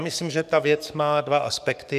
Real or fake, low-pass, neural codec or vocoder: fake; 14.4 kHz; codec, 44.1 kHz, 7.8 kbps, DAC